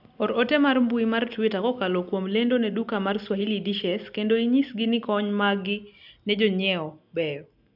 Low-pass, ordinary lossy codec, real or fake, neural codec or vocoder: 5.4 kHz; none; real; none